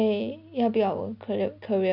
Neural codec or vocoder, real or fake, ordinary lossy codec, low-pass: none; real; none; 5.4 kHz